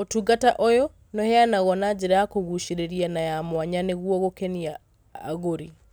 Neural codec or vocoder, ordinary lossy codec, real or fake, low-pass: none; none; real; none